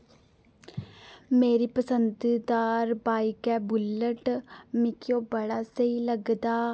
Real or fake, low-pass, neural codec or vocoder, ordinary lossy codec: real; none; none; none